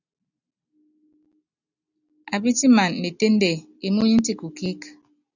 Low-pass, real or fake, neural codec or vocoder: 7.2 kHz; real; none